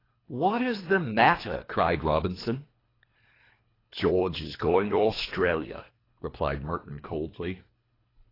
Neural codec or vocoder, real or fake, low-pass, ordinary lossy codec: codec, 24 kHz, 3 kbps, HILCodec; fake; 5.4 kHz; AAC, 24 kbps